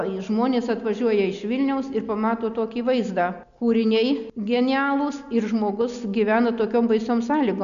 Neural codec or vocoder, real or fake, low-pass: none; real; 7.2 kHz